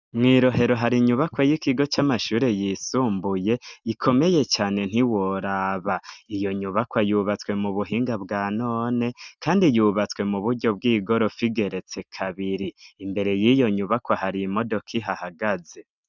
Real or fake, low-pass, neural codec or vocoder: real; 7.2 kHz; none